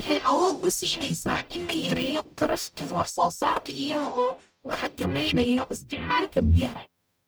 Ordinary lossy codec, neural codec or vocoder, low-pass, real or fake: none; codec, 44.1 kHz, 0.9 kbps, DAC; none; fake